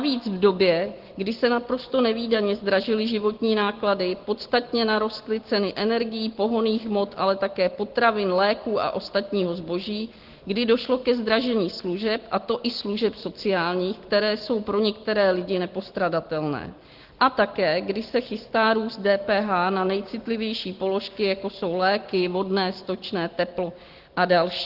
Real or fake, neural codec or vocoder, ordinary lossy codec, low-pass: real; none; Opus, 16 kbps; 5.4 kHz